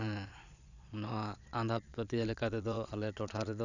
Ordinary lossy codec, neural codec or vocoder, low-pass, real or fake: none; vocoder, 22.05 kHz, 80 mel bands, Vocos; 7.2 kHz; fake